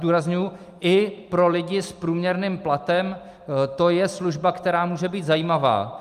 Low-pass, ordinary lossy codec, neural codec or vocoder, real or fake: 14.4 kHz; Opus, 32 kbps; none; real